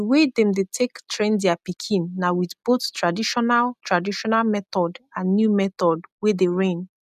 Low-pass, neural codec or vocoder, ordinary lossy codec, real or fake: 14.4 kHz; none; none; real